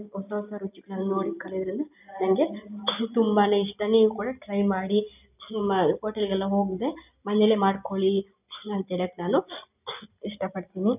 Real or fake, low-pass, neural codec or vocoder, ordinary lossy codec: real; 3.6 kHz; none; none